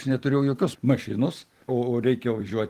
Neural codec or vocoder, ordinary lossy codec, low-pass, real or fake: vocoder, 44.1 kHz, 128 mel bands every 256 samples, BigVGAN v2; Opus, 24 kbps; 14.4 kHz; fake